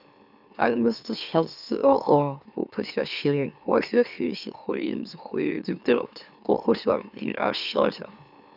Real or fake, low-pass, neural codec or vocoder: fake; 5.4 kHz; autoencoder, 44.1 kHz, a latent of 192 numbers a frame, MeloTTS